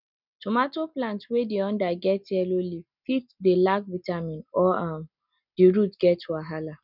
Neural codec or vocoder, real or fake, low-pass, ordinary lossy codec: none; real; 5.4 kHz; none